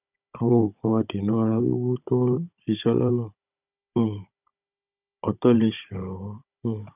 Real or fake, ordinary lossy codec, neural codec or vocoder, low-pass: fake; none; codec, 16 kHz, 16 kbps, FunCodec, trained on Chinese and English, 50 frames a second; 3.6 kHz